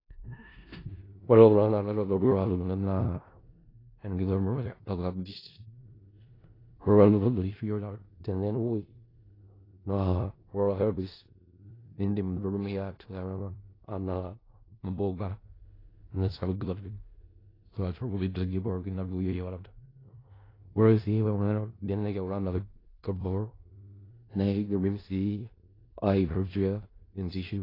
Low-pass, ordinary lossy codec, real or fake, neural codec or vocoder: 5.4 kHz; AAC, 24 kbps; fake; codec, 16 kHz in and 24 kHz out, 0.4 kbps, LongCat-Audio-Codec, four codebook decoder